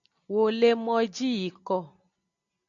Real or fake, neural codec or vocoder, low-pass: real; none; 7.2 kHz